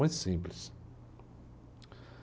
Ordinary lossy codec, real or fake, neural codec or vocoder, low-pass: none; real; none; none